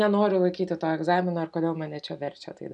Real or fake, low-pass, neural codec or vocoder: real; 10.8 kHz; none